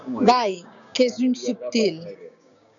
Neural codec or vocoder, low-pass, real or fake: codec, 16 kHz, 6 kbps, DAC; 7.2 kHz; fake